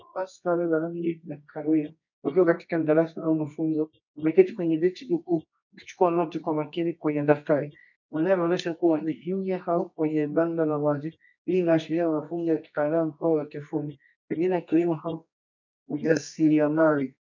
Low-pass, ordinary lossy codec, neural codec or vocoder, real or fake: 7.2 kHz; MP3, 64 kbps; codec, 24 kHz, 0.9 kbps, WavTokenizer, medium music audio release; fake